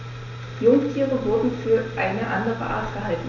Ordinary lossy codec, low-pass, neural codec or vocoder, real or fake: none; 7.2 kHz; none; real